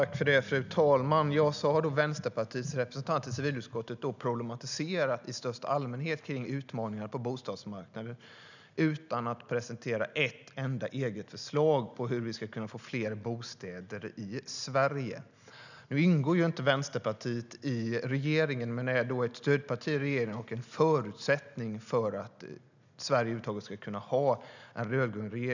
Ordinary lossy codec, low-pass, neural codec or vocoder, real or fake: none; 7.2 kHz; none; real